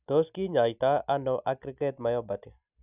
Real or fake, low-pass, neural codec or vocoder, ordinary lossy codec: real; 3.6 kHz; none; none